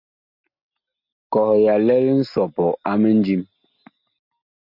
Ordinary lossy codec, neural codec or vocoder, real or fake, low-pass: MP3, 48 kbps; none; real; 5.4 kHz